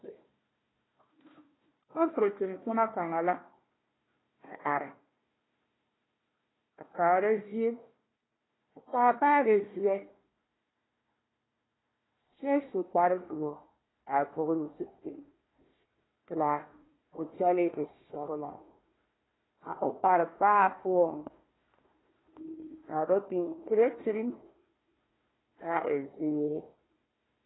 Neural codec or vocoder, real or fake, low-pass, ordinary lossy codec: codec, 16 kHz, 1 kbps, FunCodec, trained on Chinese and English, 50 frames a second; fake; 7.2 kHz; AAC, 16 kbps